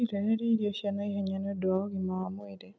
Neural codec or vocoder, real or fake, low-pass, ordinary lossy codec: none; real; none; none